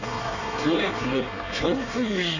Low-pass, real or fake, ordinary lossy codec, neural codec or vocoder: 7.2 kHz; fake; none; codec, 24 kHz, 1 kbps, SNAC